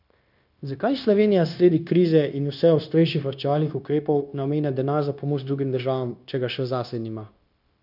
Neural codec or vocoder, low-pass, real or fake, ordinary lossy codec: codec, 16 kHz, 0.9 kbps, LongCat-Audio-Codec; 5.4 kHz; fake; none